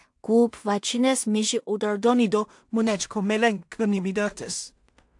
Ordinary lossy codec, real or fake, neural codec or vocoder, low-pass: AAC, 64 kbps; fake; codec, 16 kHz in and 24 kHz out, 0.4 kbps, LongCat-Audio-Codec, two codebook decoder; 10.8 kHz